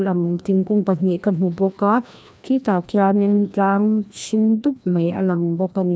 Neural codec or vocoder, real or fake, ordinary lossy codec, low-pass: codec, 16 kHz, 1 kbps, FreqCodec, larger model; fake; none; none